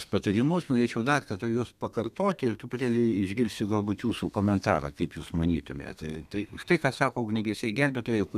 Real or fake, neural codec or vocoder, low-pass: fake; codec, 32 kHz, 1.9 kbps, SNAC; 14.4 kHz